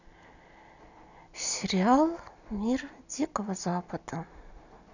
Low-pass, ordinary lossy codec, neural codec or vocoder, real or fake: 7.2 kHz; none; none; real